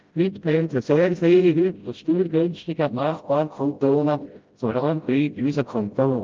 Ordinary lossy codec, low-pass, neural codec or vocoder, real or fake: Opus, 24 kbps; 7.2 kHz; codec, 16 kHz, 0.5 kbps, FreqCodec, smaller model; fake